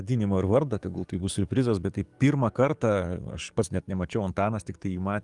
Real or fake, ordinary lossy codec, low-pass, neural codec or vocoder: fake; Opus, 32 kbps; 10.8 kHz; codec, 44.1 kHz, 7.8 kbps, DAC